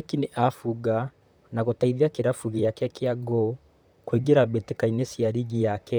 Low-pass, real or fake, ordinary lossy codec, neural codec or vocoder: none; fake; none; vocoder, 44.1 kHz, 128 mel bands, Pupu-Vocoder